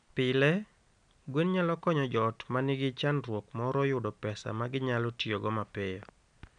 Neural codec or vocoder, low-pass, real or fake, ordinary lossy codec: none; 9.9 kHz; real; none